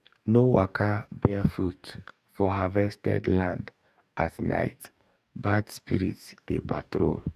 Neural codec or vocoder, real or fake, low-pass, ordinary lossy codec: codec, 44.1 kHz, 2.6 kbps, DAC; fake; 14.4 kHz; none